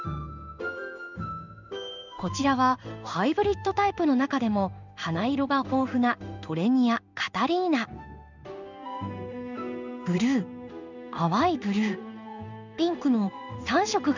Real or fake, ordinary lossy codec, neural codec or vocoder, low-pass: fake; none; codec, 16 kHz in and 24 kHz out, 1 kbps, XY-Tokenizer; 7.2 kHz